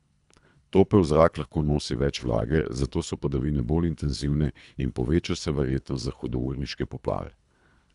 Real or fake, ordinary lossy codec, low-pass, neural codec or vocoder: fake; none; 10.8 kHz; codec, 24 kHz, 3 kbps, HILCodec